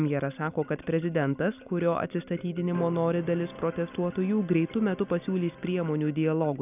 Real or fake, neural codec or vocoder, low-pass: real; none; 3.6 kHz